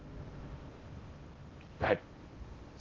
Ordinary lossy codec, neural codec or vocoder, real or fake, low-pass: Opus, 16 kbps; codec, 16 kHz in and 24 kHz out, 0.6 kbps, FocalCodec, streaming, 4096 codes; fake; 7.2 kHz